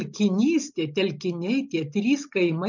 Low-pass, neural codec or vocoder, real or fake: 7.2 kHz; none; real